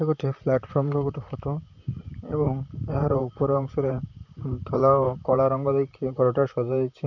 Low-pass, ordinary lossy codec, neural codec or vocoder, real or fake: 7.2 kHz; none; vocoder, 44.1 kHz, 128 mel bands, Pupu-Vocoder; fake